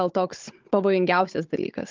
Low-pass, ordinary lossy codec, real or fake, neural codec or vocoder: 7.2 kHz; Opus, 32 kbps; fake; codec, 16 kHz, 16 kbps, FunCodec, trained on Chinese and English, 50 frames a second